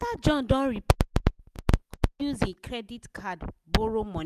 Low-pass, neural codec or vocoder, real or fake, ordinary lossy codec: 14.4 kHz; none; real; none